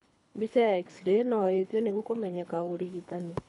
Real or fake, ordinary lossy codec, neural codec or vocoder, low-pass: fake; none; codec, 24 kHz, 3 kbps, HILCodec; 10.8 kHz